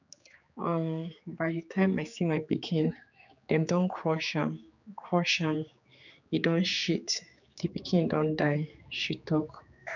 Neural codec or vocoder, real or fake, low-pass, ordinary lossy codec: codec, 16 kHz, 4 kbps, X-Codec, HuBERT features, trained on general audio; fake; 7.2 kHz; none